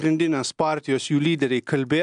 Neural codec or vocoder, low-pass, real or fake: none; 9.9 kHz; real